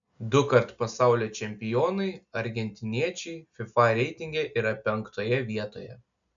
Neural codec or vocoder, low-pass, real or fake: none; 7.2 kHz; real